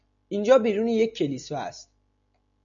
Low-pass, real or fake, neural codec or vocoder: 7.2 kHz; real; none